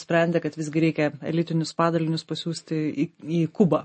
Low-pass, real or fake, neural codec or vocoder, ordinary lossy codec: 10.8 kHz; real; none; MP3, 32 kbps